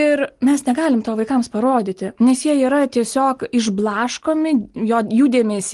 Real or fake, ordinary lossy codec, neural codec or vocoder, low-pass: real; Opus, 24 kbps; none; 10.8 kHz